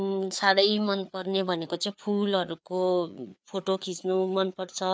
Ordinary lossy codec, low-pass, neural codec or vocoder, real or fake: none; none; codec, 16 kHz, 4 kbps, FreqCodec, larger model; fake